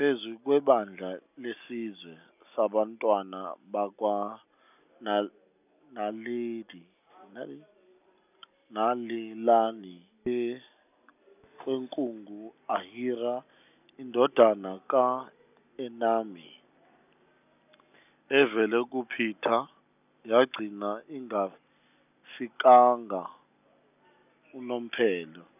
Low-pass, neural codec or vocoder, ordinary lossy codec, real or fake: 3.6 kHz; none; none; real